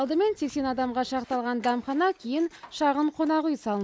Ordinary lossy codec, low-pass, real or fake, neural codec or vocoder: none; none; real; none